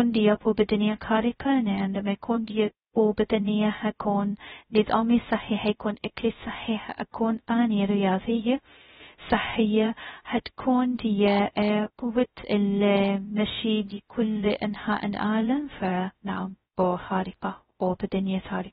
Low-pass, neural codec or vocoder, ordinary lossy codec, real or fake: 7.2 kHz; codec, 16 kHz, 0.2 kbps, FocalCodec; AAC, 16 kbps; fake